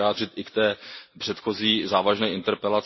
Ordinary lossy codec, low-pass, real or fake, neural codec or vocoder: MP3, 24 kbps; 7.2 kHz; real; none